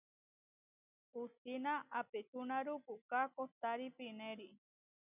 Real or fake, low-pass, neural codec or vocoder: real; 3.6 kHz; none